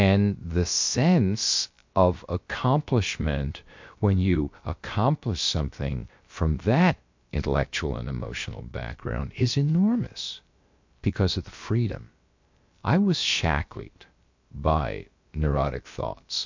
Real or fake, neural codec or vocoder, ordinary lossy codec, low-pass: fake; codec, 16 kHz, about 1 kbps, DyCAST, with the encoder's durations; MP3, 48 kbps; 7.2 kHz